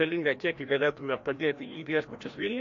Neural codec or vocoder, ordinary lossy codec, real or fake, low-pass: codec, 16 kHz, 1 kbps, FreqCodec, larger model; AAC, 48 kbps; fake; 7.2 kHz